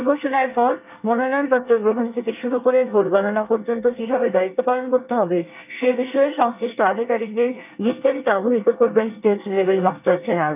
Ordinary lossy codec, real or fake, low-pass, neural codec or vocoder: none; fake; 3.6 kHz; codec, 24 kHz, 1 kbps, SNAC